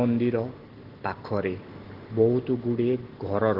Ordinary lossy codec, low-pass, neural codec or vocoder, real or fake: Opus, 16 kbps; 5.4 kHz; none; real